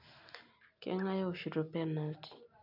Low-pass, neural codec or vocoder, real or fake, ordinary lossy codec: 5.4 kHz; none; real; none